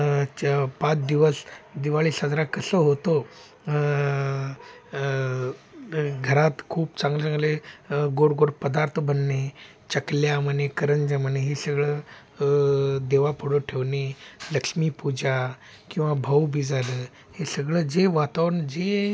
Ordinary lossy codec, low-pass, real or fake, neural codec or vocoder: none; none; real; none